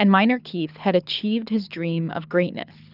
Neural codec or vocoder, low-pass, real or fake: codec, 24 kHz, 6 kbps, HILCodec; 5.4 kHz; fake